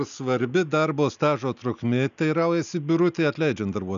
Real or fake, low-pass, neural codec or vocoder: real; 7.2 kHz; none